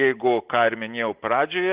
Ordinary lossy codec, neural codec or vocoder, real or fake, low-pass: Opus, 16 kbps; none; real; 3.6 kHz